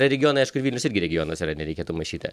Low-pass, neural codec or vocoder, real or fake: 14.4 kHz; none; real